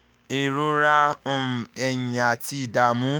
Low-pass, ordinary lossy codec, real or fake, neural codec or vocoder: none; none; fake; autoencoder, 48 kHz, 32 numbers a frame, DAC-VAE, trained on Japanese speech